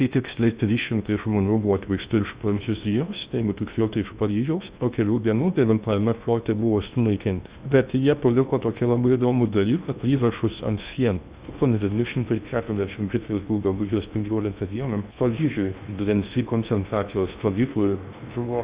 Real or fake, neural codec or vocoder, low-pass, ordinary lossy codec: fake; codec, 16 kHz in and 24 kHz out, 0.6 kbps, FocalCodec, streaming, 2048 codes; 3.6 kHz; Opus, 64 kbps